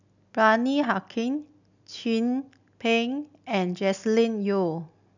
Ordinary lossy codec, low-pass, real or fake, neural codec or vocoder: none; 7.2 kHz; real; none